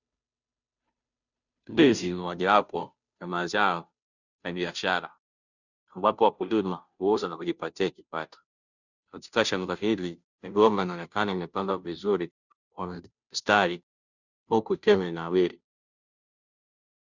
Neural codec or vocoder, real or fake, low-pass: codec, 16 kHz, 0.5 kbps, FunCodec, trained on Chinese and English, 25 frames a second; fake; 7.2 kHz